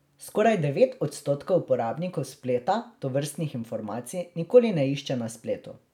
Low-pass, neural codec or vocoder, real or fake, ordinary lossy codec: 19.8 kHz; none; real; none